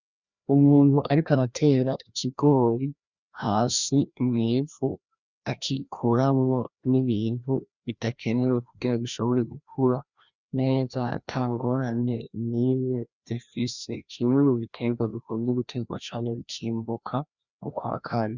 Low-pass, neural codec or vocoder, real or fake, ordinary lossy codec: 7.2 kHz; codec, 16 kHz, 1 kbps, FreqCodec, larger model; fake; Opus, 64 kbps